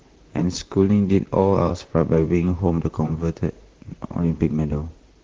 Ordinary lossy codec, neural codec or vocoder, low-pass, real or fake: Opus, 16 kbps; vocoder, 44.1 kHz, 128 mel bands, Pupu-Vocoder; 7.2 kHz; fake